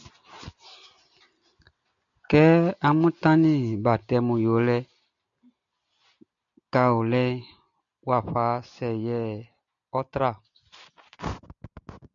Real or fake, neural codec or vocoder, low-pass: real; none; 7.2 kHz